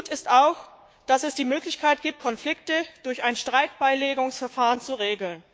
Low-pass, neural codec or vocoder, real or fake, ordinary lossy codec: none; codec, 16 kHz, 6 kbps, DAC; fake; none